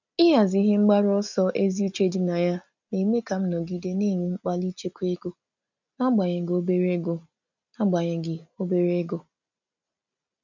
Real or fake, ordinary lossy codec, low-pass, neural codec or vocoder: real; none; 7.2 kHz; none